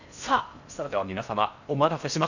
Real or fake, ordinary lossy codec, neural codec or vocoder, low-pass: fake; none; codec, 16 kHz in and 24 kHz out, 0.6 kbps, FocalCodec, streaming, 4096 codes; 7.2 kHz